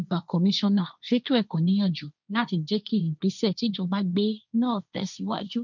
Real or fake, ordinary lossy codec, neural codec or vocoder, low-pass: fake; none; codec, 16 kHz, 1.1 kbps, Voila-Tokenizer; 7.2 kHz